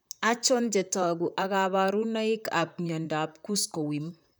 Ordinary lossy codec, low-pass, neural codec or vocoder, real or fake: none; none; vocoder, 44.1 kHz, 128 mel bands, Pupu-Vocoder; fake